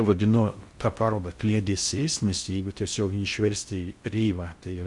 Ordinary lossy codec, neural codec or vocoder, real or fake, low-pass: Opus, 64 kbps; codec, 16 kHz in and 24 kHz out, 0.6 kbps, FocalCodec, streaming, 4096 codes; fake; 10.8 kHz